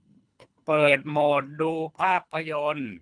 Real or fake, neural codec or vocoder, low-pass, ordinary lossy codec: fake; codec, 24 kHz, 3 kbps, HILCodec; 9.9 kHz; none